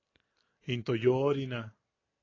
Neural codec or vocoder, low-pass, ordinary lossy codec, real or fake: none; 7.2 kHz; AAC, 32 kbps; real